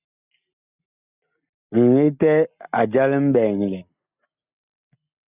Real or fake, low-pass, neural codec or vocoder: real; 3.6 kHz; none